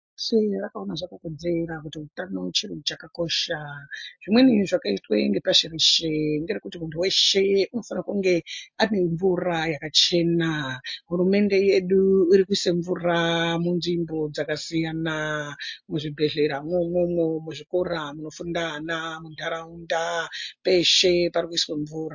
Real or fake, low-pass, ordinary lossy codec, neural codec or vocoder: real; 7.2 kHz; MP3, 48 kbps; none